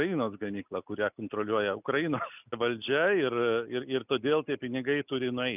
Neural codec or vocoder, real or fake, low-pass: none; real; 3.6 kHz